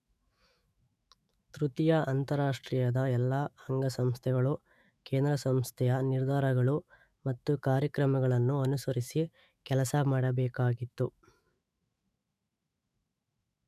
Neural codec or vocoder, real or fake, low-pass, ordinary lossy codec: autoencoder, 48 kHz, 128 numbers a frame, DAC-VAE, trained on Japanese speech; fake; 14.4 kHz; none